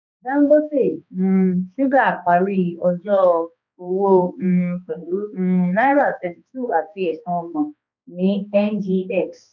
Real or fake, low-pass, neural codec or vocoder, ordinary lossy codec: fake; 7.2 kHz; codec, 16 kHz, 2 kbps, X-Codec, HuBERT features, trained on balanced general audio; none